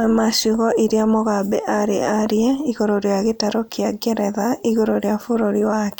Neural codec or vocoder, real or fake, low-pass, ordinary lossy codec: none; real; none; none